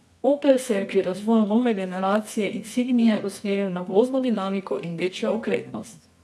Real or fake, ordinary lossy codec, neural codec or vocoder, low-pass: fake; none; codec, 24 kHz, 0.9 kbps, WavTokenizer, medium music audio release; none